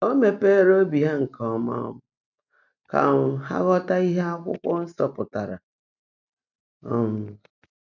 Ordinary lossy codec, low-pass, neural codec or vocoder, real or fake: none; 7.2 kHz; none; real